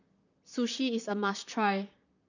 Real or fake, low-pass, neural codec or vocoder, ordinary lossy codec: fake; 7.2 kHz; vocoder, 44.1 kHz, 128 mel bands, Pupu-Vocoder; none